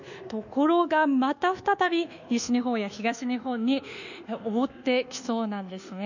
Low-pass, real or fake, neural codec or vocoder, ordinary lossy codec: 7.2 kHz; fake; codec, 24 kHz, 1.2 kbps, DualCodec; none